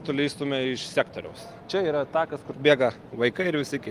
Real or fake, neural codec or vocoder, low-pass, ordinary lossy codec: real; none; 14.4 kHz; Opus, 24 kbps